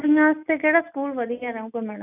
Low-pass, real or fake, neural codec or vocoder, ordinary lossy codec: 3.6 kHz; real; none; none